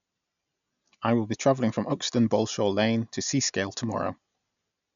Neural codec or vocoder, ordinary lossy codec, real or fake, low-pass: none; none; real; 7.2 kHz